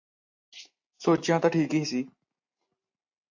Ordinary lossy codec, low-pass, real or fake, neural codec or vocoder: AAC, 48 kbps; 7.2 kHz; real; none